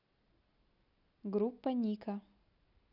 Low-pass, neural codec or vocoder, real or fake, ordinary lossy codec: 5.4 kHz; none; real; none